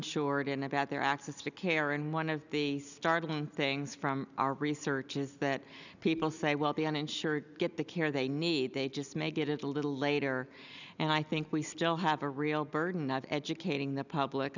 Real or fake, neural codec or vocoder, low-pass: real; none; 7.2 kHz